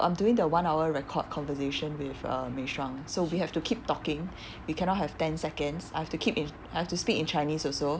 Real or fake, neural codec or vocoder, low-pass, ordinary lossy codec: real; none; none; none